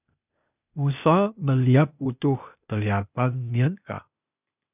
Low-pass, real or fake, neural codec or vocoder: 3.6 kHz; fake; codec, 16 kHz, 0.8 kbps, ZipCodec